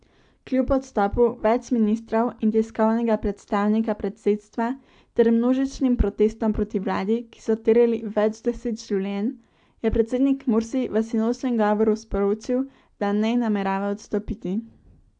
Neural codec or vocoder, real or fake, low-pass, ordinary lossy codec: none; real; 9.9 kHz; AAC, 64 kbps